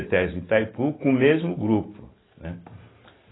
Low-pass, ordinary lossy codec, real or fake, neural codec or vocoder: 7.2 kHz; AAC, 16 kbps; real; none